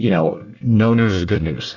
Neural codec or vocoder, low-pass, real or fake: codec, 24 kHz, 1 kbps, SNAC; 7.2 kHz; fake